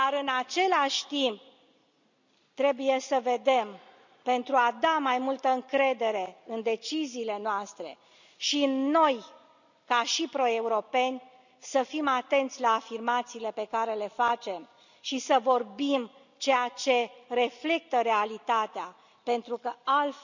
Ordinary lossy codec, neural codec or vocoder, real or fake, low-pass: none; none; real; 7.2 kHz